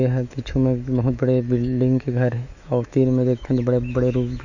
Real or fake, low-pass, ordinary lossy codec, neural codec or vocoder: fake; 7.2 kHz; none; autoencoder, 48 kHz, 128 numbers a frame, DAC-VAE, trained on Japanese speech